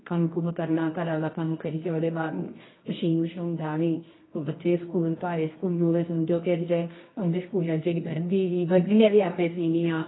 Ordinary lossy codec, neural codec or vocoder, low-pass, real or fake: AAC, 16 kbps; codec, 24 kHz, 0.9 kbps, WavTokenizer, medium music audio release; 7.2 kHz; fake